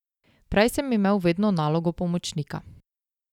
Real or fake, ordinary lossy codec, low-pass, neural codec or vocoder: real; none; 19.8 kHz; none